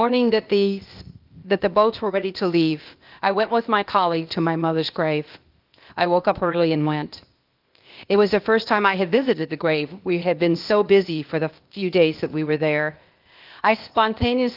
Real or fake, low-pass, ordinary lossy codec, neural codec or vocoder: fake; 5.4 kHz; Opus, 32 kbps; codec, 16 kHz, 0.8 kbps, ZipCodec